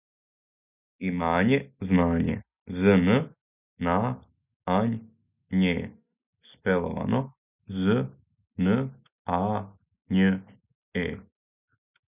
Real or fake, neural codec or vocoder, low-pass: real; none; 3.6 kHz